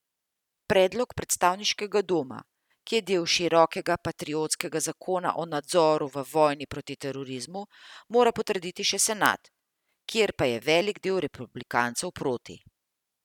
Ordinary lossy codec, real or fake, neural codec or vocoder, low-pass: none; real; none; 19.8 kHz